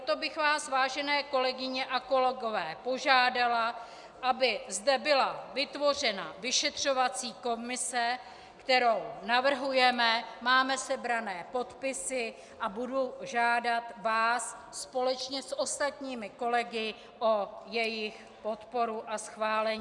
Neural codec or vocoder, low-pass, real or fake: none; 10.8 kHz; real